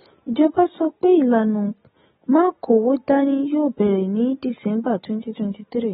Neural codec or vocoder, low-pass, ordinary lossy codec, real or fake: none; 19.8 kHz; AAC, 16 kbps; real